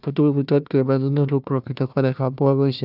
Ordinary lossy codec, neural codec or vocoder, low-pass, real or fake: none; codec, 16 kHz, 1 kbps, FunCodec, trained on Chinese and English, 50 frames a second; 5.4 kHz; fake